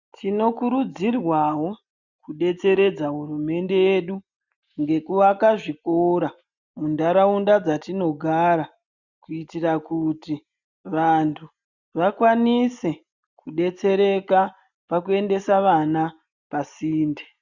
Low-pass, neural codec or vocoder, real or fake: 7.2 kHz; none; real